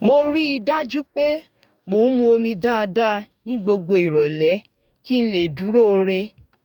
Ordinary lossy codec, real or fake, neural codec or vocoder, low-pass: none; fake; codec, 44.1 kHz, 2.6 kbps, DAC; 19.8 kHz